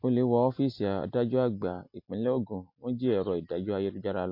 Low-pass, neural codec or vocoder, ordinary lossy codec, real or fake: 5.4 kHz; none; MP3, 32 kbps; real